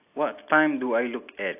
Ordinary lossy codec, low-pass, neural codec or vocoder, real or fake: AAC, 32 kbps; 3.6 kHz; none; real